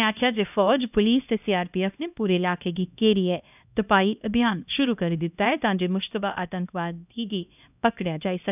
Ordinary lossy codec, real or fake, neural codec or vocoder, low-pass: none; fake; codec, 16 kHz, 1 kbps, X-Codec, HuBERT features, trained on LibriSpeech; 3.6 kHz